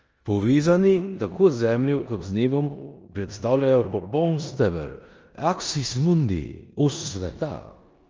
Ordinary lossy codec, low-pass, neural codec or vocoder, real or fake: Opus, 24 kbps; 7.2 kHz; codec, 16 kHz in and 24 kHz out, 0.9 kbps, LongCat-Audio-Codec, four codebook decoder; fake